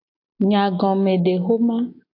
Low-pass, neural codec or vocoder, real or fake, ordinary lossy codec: 5.4 kHz; none; real; AAC, 32 kbps